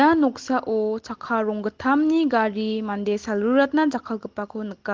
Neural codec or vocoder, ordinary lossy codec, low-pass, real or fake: none; Opus, 16 kbps; 7.2 kHz; real